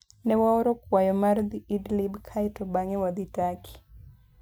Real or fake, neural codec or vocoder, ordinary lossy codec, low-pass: real; none; none; none